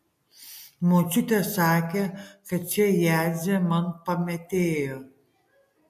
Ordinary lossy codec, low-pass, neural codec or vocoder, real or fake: MP3, 64 kbps; 14.4 kHz; none; real